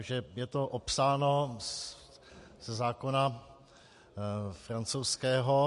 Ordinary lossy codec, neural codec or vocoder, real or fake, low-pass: MP3, 48 kbps; none; real; 14.4 kHz